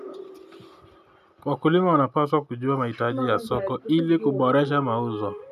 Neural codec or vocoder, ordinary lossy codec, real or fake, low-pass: none; none; real; 14.4 kHz